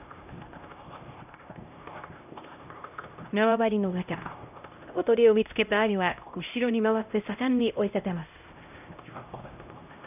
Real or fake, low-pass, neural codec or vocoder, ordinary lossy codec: fake; 3.6 kHz; codec, 16 kHz, 0.5 kbps, X-Codec, HuBERT features, trained on LibriSpeech; none